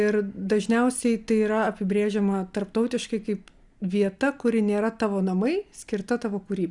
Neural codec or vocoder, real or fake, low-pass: none; real; 10.8 kHz